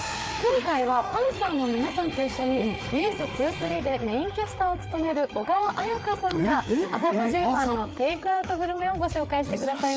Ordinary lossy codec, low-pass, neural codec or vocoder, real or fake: none; none; codec, 16 kHz, 4 kbps, FreqCodec, larger model; fake